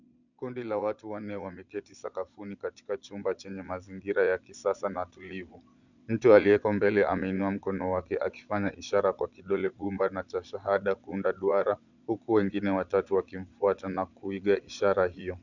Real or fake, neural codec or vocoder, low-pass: fake; vocoder, 22.05 kHz, 80 mel bands, Vocos; 7.2 kHz